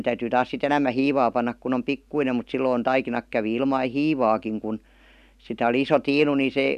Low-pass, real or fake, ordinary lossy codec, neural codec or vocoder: 14.4 kHz; real; none; none